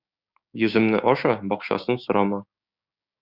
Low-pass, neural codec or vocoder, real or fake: 5.4 kHz; codec, 16 kHz, 6 kbps, DAC; fake